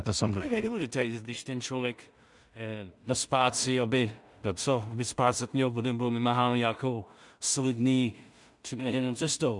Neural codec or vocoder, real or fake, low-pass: codec, 16 kHz in and 24 kHz out, 0.4 kbps, LongCat-Audio-Codec, two codebook decoder; fake; 10.8 kHz